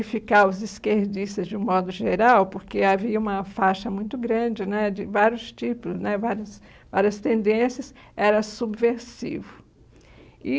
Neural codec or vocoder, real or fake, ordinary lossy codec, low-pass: none; real; none; none